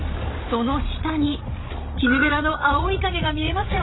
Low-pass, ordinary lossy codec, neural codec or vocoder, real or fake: 7.2 kHz; AAC, 16 kbps; codec, 16 kHz, 16 kbps, FreqCodec, larger model; fake